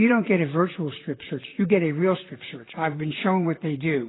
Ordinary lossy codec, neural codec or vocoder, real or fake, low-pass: AAC, 16 kbps; vocoder, 44.1 kHz, 128 mel bands, Pupu-Vocoder; fake; 7.2 kHz